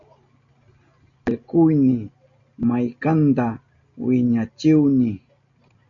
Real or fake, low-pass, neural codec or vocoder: real; 7.2 kHz; none